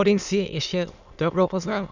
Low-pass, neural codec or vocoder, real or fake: 7.2 kHz; autoencoder, 22.05 kHz, a latent of 192 numbers a frame, VITS, trained on many speakers; fake